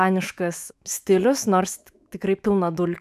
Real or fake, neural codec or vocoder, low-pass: fake; autoencoder, 48 kHz, 128 numbers a frame, DAC-VAE, trained on Japanese speech; 14.4 kHz